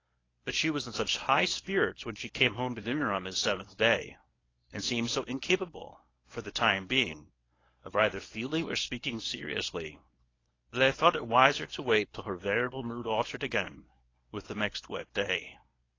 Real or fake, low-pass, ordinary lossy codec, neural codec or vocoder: fake; 7.2 kHz; AAC, 32 kbps; codec, 24 kHz, 0.9 kbps, WavTokenizer, medium speech release version 1